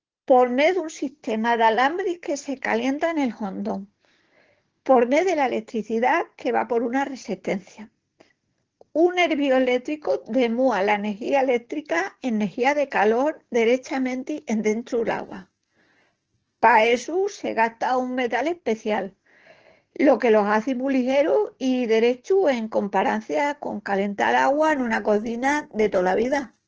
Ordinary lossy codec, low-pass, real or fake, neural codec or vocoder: Opus, 16 kbps; 7.2 kHz; fake; codec, 44.1 kHz, 7.8 kbps, DAC